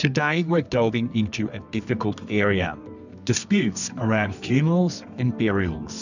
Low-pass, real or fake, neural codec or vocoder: 7.2 kHz; fake; codec, 24 kHz, 0.9 kbps, WavTokenizer, medium music audio release